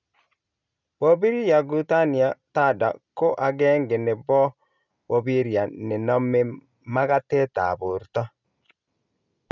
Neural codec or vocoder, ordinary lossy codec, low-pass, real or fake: none; none; 7.2 kHz; real